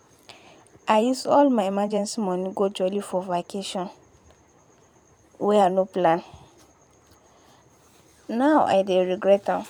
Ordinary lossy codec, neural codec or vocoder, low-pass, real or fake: none; vocoder, 44.1 kHz, 128 mel bands every 512 samples, BigVGAN v2; 19.8 kHz; fake